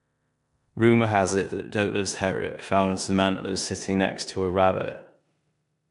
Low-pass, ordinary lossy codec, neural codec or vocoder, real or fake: 10.8 kHz; none; codec, 16 kHz in and 24 kHz out, 0.9 kbps, LongCat-Audio-Codec, four codebook decoder; fake